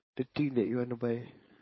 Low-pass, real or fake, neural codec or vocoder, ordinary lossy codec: 7.2 kHz; fake; codec, 16 kHz, 4.8 kbps, FACodec; MP3, 24 kbps